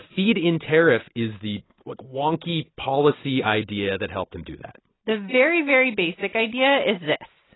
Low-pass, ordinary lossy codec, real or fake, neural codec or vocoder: 7.2 kHz; AAC, 16 kbps; real; none